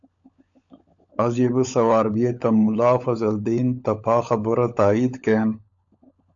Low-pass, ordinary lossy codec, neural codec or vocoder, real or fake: 7.2 kHz; AAC, 64 kbps; codec, 16 kHz, 16 kbps, FunCodec, trained on LibriTTS, 50 frames a second; fake